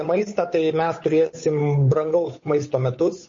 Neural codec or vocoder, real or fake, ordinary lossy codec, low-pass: codec, 16 kHz, 8 kbps, FunCodec, trained on Chinese and English, 25 frames a second; fake; MP3, 32 kbps; 7.2 kHz